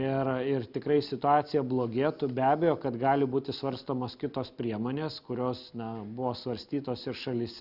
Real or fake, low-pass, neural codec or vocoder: real; 5.4 kHz; none